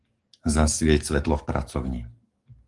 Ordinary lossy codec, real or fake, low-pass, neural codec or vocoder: Opus, 24 kbps; fake; 10.8 kHz; codec, 44.1 kHz, 7.8 kbps, DAC